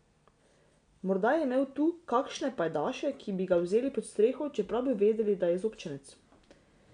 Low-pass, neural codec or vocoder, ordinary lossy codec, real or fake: 9.9 kHz; none; none; real